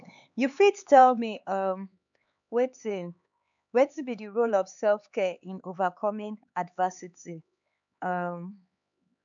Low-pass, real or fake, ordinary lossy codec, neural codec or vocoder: 7.2 kHz; fake; none; codec, 16 kHz, 4 kbps, X-Codec, HuBERT features, trained on LibriSpeech